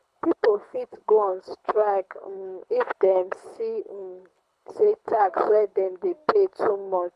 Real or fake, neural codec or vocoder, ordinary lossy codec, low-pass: fake; codec, 24 kHz, 6 kbps, HILCodec; none; none